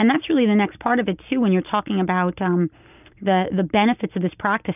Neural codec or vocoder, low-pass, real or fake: none; 3.6 kHz; real